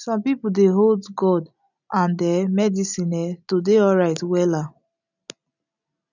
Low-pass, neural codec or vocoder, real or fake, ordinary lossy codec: 7.2 kHz; none; real; none